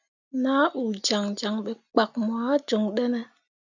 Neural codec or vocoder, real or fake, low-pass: none; real; 7.2 kHz